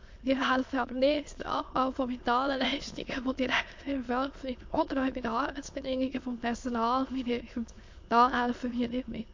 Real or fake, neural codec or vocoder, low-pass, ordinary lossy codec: fake; autoencoder, 22.05 kHz, a latent of 192 numbers a frame, VITS, trained on many speakers; 7.2 kHz; MP3, 48 kbps